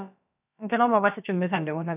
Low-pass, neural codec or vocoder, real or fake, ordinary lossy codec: 3.6 kHz; codec, 16 kHz, about 1 kbps, DyCAST, with the encoder's durations; fake; none